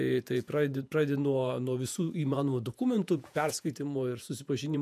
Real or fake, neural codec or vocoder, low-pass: fake; vocoder, 48 kHz, 128 mel bands, Vocos; 14.4 kHz